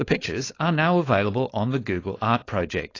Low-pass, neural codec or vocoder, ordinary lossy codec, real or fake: 7.2 kHz; codec, 16 kHz, 4.8 kbps, FACodec; AAC, 32 kbps; fake